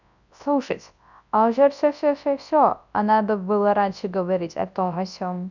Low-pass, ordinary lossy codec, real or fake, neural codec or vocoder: 7.2 kHz; none; fake; codec, 24 kHz, 0.9 kbps, WavTokenizer, large speech release